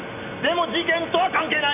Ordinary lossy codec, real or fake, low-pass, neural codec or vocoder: none; real; 3.6 kHz; none